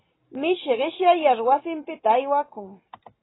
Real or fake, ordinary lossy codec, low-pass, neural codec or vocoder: real; AAC, 16 kbps; 7.2 kHz; none